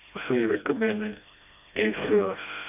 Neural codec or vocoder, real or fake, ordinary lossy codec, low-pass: codec, 16 kHz, 1 kbps, FreqCodec, smaller model; fake; none; 3.6 kHz